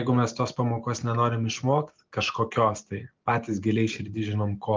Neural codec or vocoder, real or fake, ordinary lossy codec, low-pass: none; real; Opus, 32 kbps; 7.2 kHz